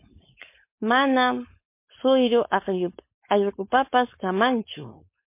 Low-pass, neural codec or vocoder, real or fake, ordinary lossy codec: 3.6 kHz; codec, 16 kHz, 4.8 kbps, FACodec; fake; MP3, 24 kbps